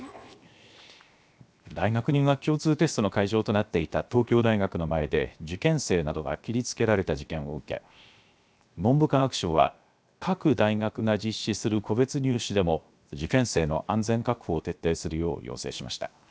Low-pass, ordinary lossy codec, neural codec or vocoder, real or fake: none; none; codec, 16 kHz, 0.7 kbps, FocalCodec; fake